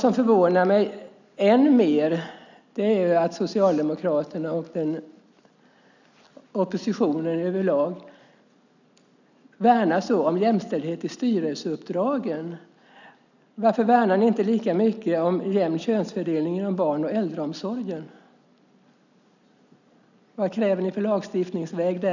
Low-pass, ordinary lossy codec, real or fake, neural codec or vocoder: 7.2 kHz; none; real; none